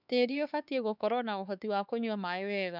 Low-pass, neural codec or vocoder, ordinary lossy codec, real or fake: 5.4 kHz; codec, 16 kHz, 4 kbps, X-Codec, HuBERT features, trained on LibriSpeech; none; fake